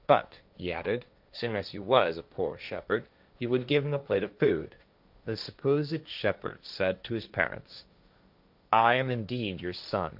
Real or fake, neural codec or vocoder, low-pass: fake; codec, 16 kHz, 1.1 kbps, Voila-Tokenizer; 5.4 kHz